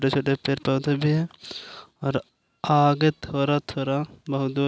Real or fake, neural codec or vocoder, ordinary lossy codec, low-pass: real; none; none; none